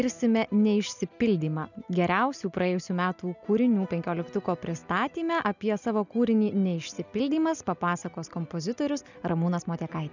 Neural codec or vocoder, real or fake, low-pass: none; real; 7.2 kHz